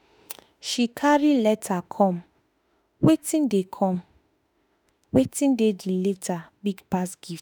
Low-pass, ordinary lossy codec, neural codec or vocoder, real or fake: none; none; autoencoder, 48 kHz, 32 numbers a frame, DAC-VAE, trained on Japanese speech; fake